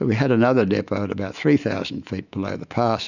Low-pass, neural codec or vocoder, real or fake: 7.2 kHz; autoencoder, 48 kHz, 128 numbers a frame, DAC-VAE, trained on Japanese speech; fake